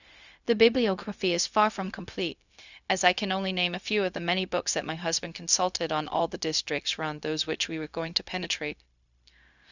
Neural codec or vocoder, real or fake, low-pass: codec, 16 kHz, 0.4 kbps, LongCat-Audio-Codec; fake; 7.2 kHz